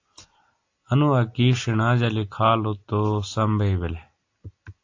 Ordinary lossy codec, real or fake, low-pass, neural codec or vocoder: AAC, 48 kbps; real; 7.2 kHz; none